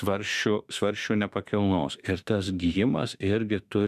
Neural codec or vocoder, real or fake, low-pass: autoencoder, 48 kHz, 32 numbers a frame, DAC-VAE, trained on Japanese speech; fake; 14.4 kHz